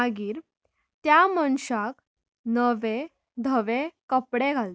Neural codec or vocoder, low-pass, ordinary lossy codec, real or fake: none; none; none; real